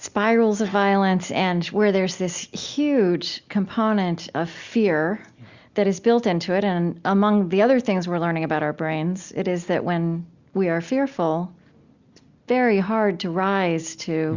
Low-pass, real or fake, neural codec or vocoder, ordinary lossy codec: 7.2 kHz; real; none; Opus, 64 kbps